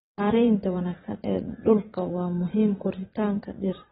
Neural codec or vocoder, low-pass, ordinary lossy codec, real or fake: vocoder, 22.05 kHz, 80 mel bands, Vocos; 9.9 kHz; AAC, 16 kbps; fake